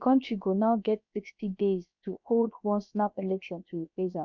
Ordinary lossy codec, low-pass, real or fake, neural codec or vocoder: none; 7.2 kHz; fake; codec, 16 kHz, about 1 kbps, DyCAST, with the encoder's durations